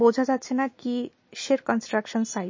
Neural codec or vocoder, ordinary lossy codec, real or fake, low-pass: none; MP3, 32 kbps; real; 7.2 kHz